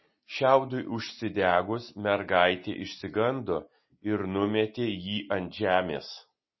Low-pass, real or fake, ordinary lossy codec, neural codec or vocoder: 7.2 kHz; real; MP3, 24 kbps; none